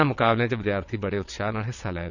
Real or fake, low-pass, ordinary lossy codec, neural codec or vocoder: fake; 7.2 kHz; none; vocoder, 22.05 kHz, 80 mel bands, WaveNeXt